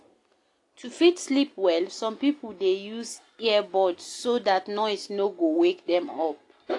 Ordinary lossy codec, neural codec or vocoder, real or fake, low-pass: AAC, 48 kbps; none; real; 10.8 kHz